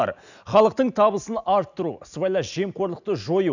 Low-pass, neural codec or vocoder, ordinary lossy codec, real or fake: 7.2 kHz; none; none; real